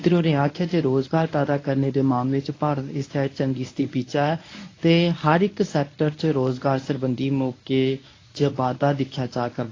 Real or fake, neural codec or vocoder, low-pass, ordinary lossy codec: fake; codec, 24 kHz, 0.9 kbps, WavTokenizer, medium speech release version 1; 7.2 kHz; AAC, 32 kbps